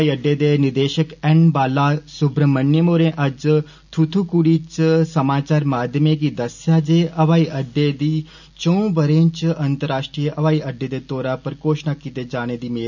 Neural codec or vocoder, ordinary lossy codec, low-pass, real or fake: none; none; 7.2 kHz; real